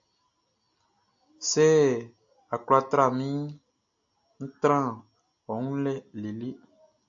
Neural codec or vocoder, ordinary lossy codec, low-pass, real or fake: none; AAC, 64 kbps; 7.2 kHz; real